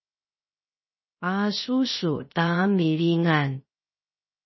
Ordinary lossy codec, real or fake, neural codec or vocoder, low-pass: MP3, 24 kbps; fake; codec, 16 kHz, 0.3 kbps, FocalCodec; 7.2 kHz